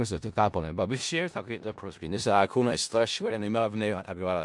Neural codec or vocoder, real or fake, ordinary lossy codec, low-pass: codec, 16 kHz in and 24 kHz out, 0.4 kbps, LongCat-Audio-Codec, four codebook decoder; fake; MP3, 64 kbps; 10.8 kHz